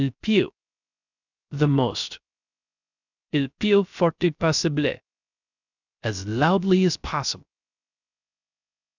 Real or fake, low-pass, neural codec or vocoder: fake; 7.2 kHz; codec, 16 kHz, 0.3 kbps, FocalCodec